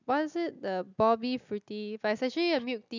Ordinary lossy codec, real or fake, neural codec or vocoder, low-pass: none; real; none; 7.2 kHz